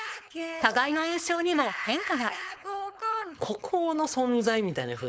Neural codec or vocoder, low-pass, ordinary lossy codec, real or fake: codec, 16 kHz, 4.8 kbps, FACodec; none; none; fake